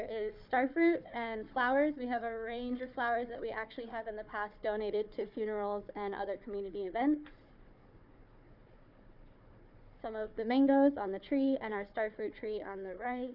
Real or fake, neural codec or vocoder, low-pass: fake; codec, 16 kHz, 4 kbps, FunCodec, trained on Chinese and English, 50 frames a second; 5.4 kHz